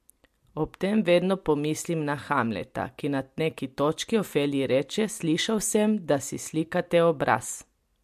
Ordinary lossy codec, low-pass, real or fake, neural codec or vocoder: MP3, 64 kbps; 14.4 kHz; real; none